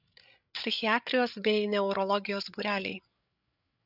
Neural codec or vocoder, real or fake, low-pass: codec, 16 kHz, 8 kbps, FreqCodec, larger model; fake; 5.4 kHz